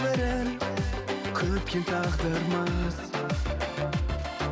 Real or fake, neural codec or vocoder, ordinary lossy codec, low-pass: real; none; none; none